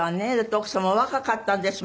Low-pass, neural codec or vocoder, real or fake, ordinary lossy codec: none; none; real; none